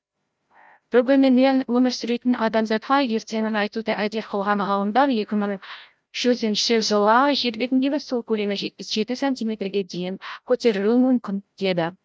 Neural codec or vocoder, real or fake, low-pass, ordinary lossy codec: codec, 16 kHz, 0.5 kbps, FreqCodec, larger model; fake; none; none